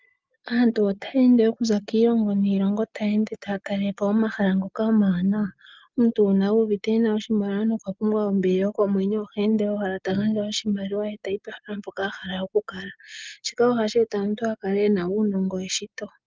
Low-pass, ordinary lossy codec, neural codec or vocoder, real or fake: 7.2 kHz; Opus, 24 kbps; none; real